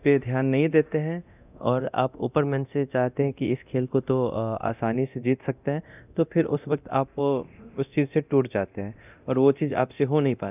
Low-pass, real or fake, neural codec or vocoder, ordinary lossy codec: 3.6 kHz; fake; codec, 24 kHz, 0.9 kbps, DualCodec; none